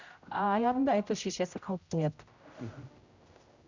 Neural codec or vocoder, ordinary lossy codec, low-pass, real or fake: codec, 16 kHz, 0.5 kbps, X-Codec, HuBERT features, trained on general audio; Opus, 64 kbps; 7.2 kHz; fake